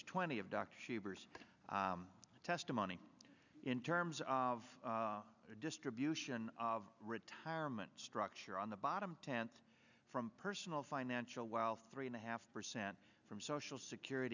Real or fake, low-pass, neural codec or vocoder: real; 7.2 kHz; none